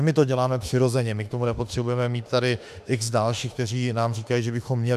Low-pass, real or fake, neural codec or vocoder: 14.4 kHz; fake; autoencoder, 48 kHz, 32 numbers a frame, DAC-VAE, trained on Japanese speech